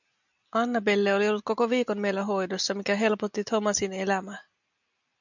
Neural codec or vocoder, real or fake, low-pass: none; real; 7.2 kHz